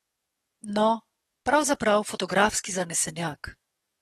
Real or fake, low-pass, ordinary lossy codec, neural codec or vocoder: fake; 19.8 kHz; AAC, 32 kbps; codec, 44.1 kHz, 7.8 kbps, DAC